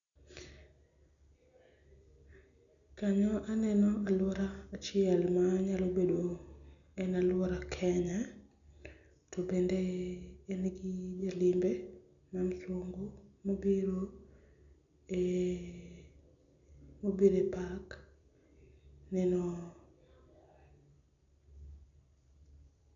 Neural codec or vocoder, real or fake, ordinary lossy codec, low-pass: none; real; none; 7.2 kHz